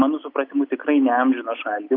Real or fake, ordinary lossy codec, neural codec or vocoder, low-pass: real; Opus, 64 kbps; none; 5.4 kHz